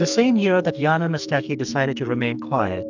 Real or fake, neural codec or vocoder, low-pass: fake; codec, 44.1 kHz, 2.6 kbps, SNAC; 7.2 kHz